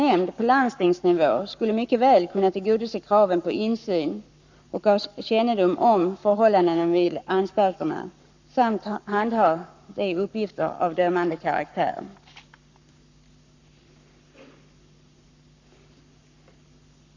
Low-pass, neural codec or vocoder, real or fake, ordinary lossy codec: 7.2 kHz; codec, 44.1 kHz, 7.8 kbps, Pupu-Codec; fake; none